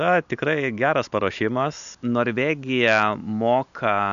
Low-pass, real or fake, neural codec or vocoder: 7.2 kHz; real; none